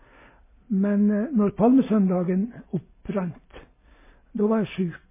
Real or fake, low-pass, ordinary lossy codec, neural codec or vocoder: real; 3.6 kHz; MP3, 16 kbps; none